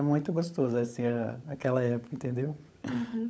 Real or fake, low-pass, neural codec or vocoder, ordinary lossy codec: fake; none; codec, 16 kHz, 16 kbps, FunCodec, trained on LibriTTS, 50 frames a second; none